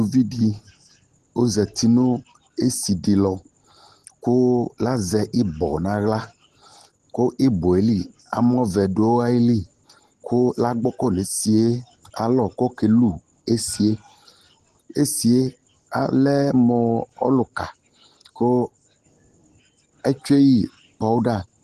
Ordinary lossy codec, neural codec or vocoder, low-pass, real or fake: Opus, 16 kbps; none; 14.4 kHz; real